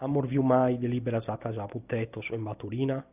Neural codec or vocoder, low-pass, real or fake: none; 3.6 kHz; real